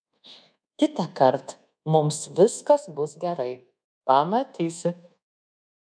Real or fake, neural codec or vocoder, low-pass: fake; codec, 24 kHz, 1.2 kbps, DualCodec; 9.9 kHz